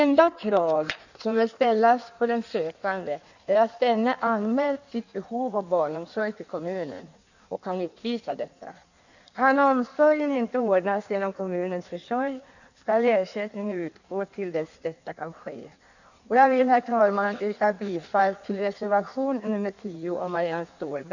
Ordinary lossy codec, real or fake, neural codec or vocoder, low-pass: none; fake; codec, 16 kHz in and 24 kHz out, 1.1 kbps, FireRedTTS-2 codec; 7.2 kHz